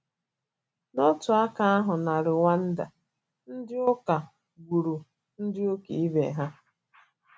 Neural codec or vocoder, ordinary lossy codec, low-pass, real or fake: none; none; none; real